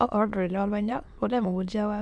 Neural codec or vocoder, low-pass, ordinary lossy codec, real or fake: autoencoder, 22.05 kHz, a latent of 192 numbers a frame, VITS, trained on many speakers; none; none; fake